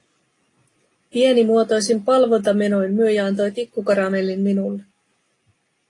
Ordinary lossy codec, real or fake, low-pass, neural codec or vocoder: AAC, 32 kbps; real; 10.8 kHz; none